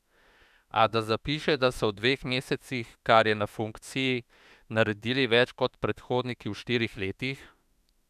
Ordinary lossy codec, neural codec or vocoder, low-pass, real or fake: none; autoencoder, 48 kHz, 32 numbers a frame, DAC-VAE, trained on Japanese speech; 14.4 kHz; fake